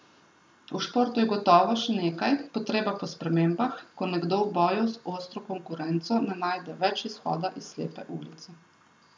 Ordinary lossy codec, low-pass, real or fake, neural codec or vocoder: none; none; real; none